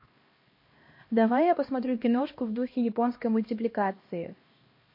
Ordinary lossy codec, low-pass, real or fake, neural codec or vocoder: MP3, 32 kbps; 5.4 kHz; fake; codec, 16 kHz, 1 kbps, X-Codec, HuBERT features, trained on LibriSpeech